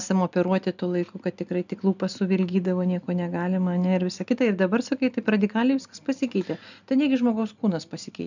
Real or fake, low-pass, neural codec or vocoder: real; 7.2 kHz; none